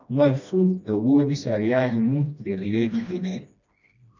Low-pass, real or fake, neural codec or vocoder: 7.2 kHz; fake; codec, 16 kHz, 1 kbps, FreqCodec, smaller model